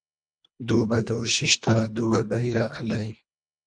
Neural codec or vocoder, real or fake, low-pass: codec, 24 kHz, 1.5 kbps, HILCodec; fake; 9.9 kHz